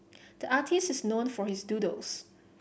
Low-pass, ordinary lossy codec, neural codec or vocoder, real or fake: none; none; none; real